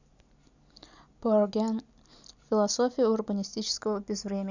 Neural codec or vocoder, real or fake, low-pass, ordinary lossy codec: none; real; 7.2 kHz; none